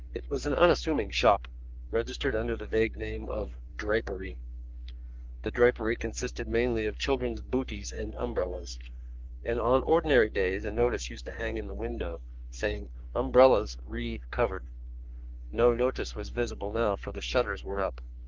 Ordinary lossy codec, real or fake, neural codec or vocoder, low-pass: Opus, 32 kbps; fake; codec, 44.1 kHz, 3.4 kbps, Pupu-Codec; 7.2 kHz